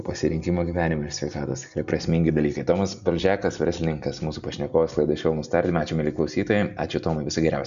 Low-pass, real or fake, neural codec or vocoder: 7.2 kHz; real; none